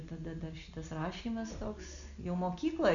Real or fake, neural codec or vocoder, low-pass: real; none; 7.2 kHz